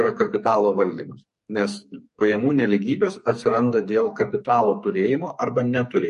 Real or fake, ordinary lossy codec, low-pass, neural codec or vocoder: fake; MP3, 48 kbps; 14.4 kHz; codec, 44.1 kHz, 2.6 kbps, SNAC